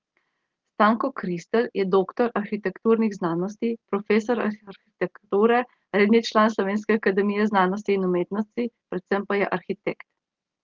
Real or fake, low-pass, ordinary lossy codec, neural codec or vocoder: real; 7.2 kHz; Opus, 16 kbps; none